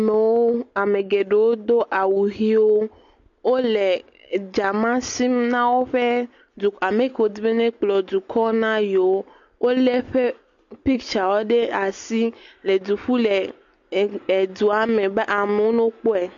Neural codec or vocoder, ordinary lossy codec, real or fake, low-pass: none; MP3, 48 kbps; real; 7.2 kHz